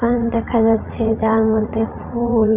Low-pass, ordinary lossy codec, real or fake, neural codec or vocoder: 3.6 kHz; none; fake; vocoder, 44.1 kHz, 80 mel bands, Vocos